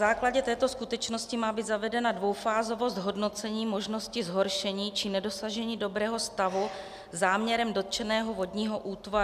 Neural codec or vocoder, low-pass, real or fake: none; 14.4 kHz; real